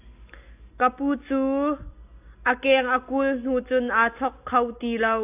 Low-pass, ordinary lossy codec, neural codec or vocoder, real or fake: 3.6 kHz; AAC, 32 kbps; none; real